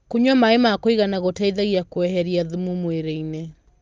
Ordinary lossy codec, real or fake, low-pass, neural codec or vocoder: Opus, 32 kbps; real; 7.2 kHz; none